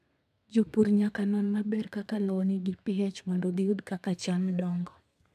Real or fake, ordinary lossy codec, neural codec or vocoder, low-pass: fake; none; codec, 32 kHz, 1.9 kbps, SNAC; 14.4 kHz